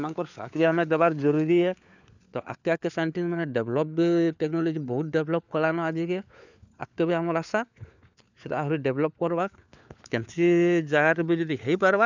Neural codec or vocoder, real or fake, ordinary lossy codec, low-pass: codec, 16 kHz, 4 kbps, FunCodec, trained on LibriTTS, 50 frames a second; fake; none; 7.2 kHz